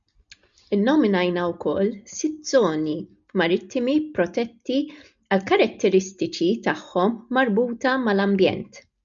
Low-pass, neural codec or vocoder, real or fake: 7.2 kHz; none; real